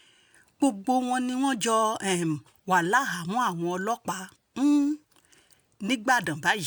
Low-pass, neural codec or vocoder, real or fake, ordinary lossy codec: none; none; real; none